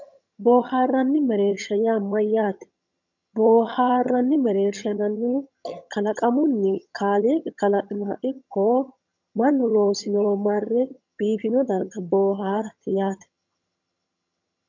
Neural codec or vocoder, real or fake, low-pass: vocoder, 22.05 kHz, 80 mel bands, HiFi-GAN; fake; 7.2 kHz